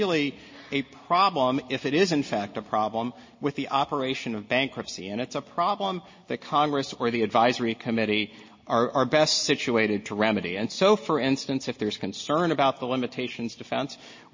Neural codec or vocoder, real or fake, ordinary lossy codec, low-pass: none; real; MP3, 32 kbps; 7.2 kHz